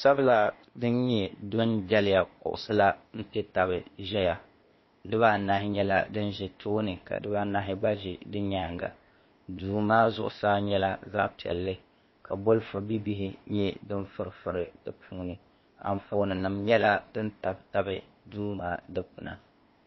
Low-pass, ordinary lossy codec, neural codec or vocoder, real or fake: 7.2 kHz; MP3, 24 kbps; codec, 16 kHz, 0.8 kbps, ZipCodec; fake